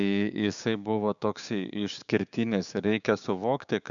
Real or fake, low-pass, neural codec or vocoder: fake; 7.2 kHz; codec, 16 kHz, 6 kbps, DAC